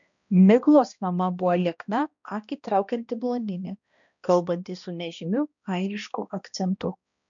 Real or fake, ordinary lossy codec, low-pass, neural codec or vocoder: fake; MP3, 96 kbps; 7.2 kHz; codec, 16 kHz, 1 kbps, X-Codec, HuBERT features, trained on balanced general audio